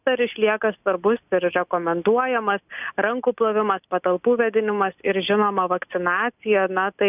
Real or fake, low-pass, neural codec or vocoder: real; 3.6 kHz; none